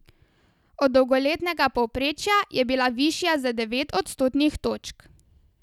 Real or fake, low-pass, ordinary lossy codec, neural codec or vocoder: real; 19.8 kHz; none; none